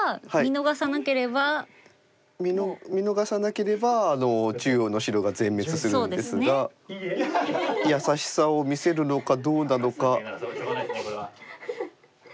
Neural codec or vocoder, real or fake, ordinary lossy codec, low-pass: none; real; none; none